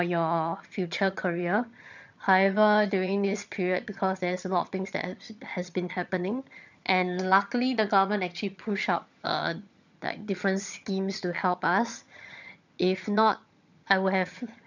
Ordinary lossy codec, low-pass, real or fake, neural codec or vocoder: none; 7.2 kHz; fake; vocoder, 22.05 kHz, 80 mel bands, HiFi-GAN